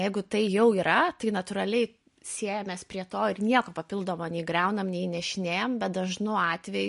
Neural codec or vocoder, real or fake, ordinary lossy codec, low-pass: none; real; MP3, 48 kbps; 10.8 kHz